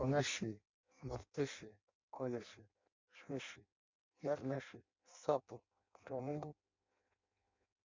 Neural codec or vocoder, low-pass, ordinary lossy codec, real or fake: codec, 16 kHz in and 24 kHz out, 0.6 kbps, FireRedTTS-2 codec; 7.2 kHz; MP3, 64 kbps; fake